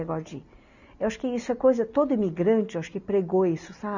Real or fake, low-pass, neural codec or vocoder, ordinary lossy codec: real; 7.2 kHz; none; none